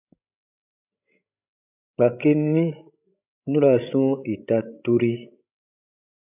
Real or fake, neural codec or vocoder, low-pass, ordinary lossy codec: fake; codec, 16 kHz, 16 kbps, FreqCodec, larger model; 3.6 kHz; AAC, 32 kbps